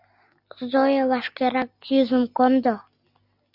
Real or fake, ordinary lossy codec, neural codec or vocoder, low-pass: real; Opus, 64 kbps; none; 5.4 kHz